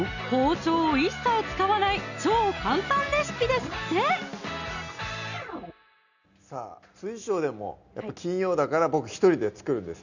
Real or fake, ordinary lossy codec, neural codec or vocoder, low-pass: real; none; none; 7.2 kHz